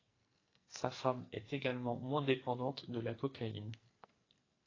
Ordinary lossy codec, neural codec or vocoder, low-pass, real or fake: AAC, 32 kbps; codec, 44.1 kHz, 2.6 kbps, SNAC; 7.2 kHz; fake